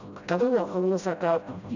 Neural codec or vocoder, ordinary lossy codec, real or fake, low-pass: codec, 16 kHz, 0.5 kbps, FreqCodec, smaller model; none; fake; 7.2 kHz